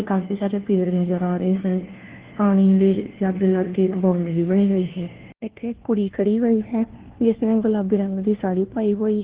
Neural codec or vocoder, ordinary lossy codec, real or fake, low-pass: codec, 16 kHz, 1 kbps, FunCodec, trained on LibriTTS, 50 frames a second; Opus, 16 kbps; fake; 3.6 kHz